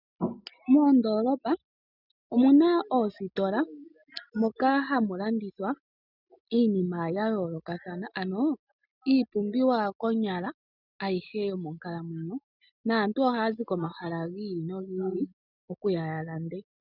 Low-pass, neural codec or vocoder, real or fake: 5.4 kHz; none; real